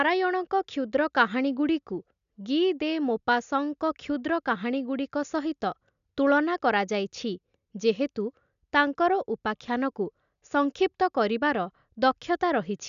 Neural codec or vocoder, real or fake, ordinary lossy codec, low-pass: none; real; none; 7.2 kHz